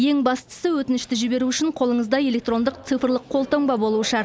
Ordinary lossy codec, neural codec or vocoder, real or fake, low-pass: none; none; real; none